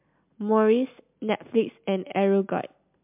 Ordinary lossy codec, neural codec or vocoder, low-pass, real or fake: MP3, 32 kbps; none; 3.6 kHz; real